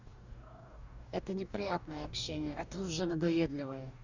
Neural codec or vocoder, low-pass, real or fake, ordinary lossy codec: codec, 44.1 kHz, 2.6 kbps, DAC; 7.2 kHz; fake; none